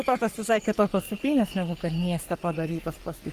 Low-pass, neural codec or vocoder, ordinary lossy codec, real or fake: 14.4 kHz; codec, 44.1 kHz, 3.4 kbps, Pupu-Codec; Opus, 32 kbps; fake